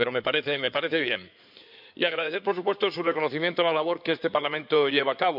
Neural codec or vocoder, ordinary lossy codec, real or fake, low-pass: codec, 16 kHz in and 24 kHz out, 2.2 kbps, FireRedTTS-2 codec; none; fake; 5.4 kHz